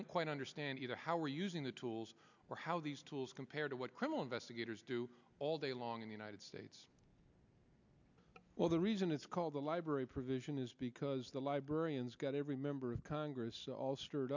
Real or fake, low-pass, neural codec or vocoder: real; 7.2 kHz; none